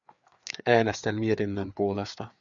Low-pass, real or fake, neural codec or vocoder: 7.2 kHz; fake; codec, 16 kHz, 4 kbps, FreqCodec, larger model